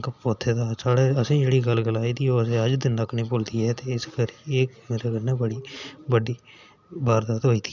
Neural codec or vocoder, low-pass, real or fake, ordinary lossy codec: none; 7.2 kHz; real; none